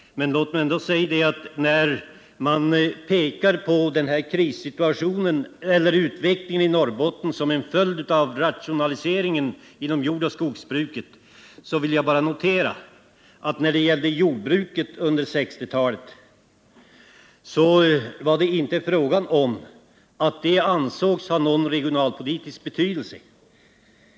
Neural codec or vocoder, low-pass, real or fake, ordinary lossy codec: none; none; real; none